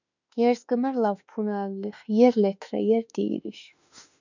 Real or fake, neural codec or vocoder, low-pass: fake; autoencoder, 48 kHz, 32 numbers a frame, DAC-VAE, trained on Japanese speech; 7.2 kHz